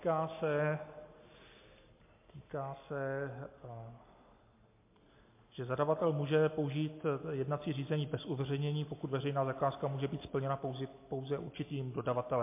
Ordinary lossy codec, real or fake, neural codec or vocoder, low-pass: MP3, 24 kbps; real; none; 3.6 kHz